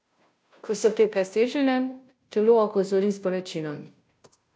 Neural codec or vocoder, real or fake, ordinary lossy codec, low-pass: codec, 16 kHz, 0.5 kbps, FunCodec, trained on Chinese and English, 25 frames a second; fake; none; none